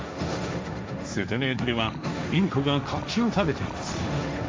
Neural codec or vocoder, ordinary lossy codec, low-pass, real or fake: codec, 16 kHz, 1.1 kbps, Voila-Tokenizer; none; none; fake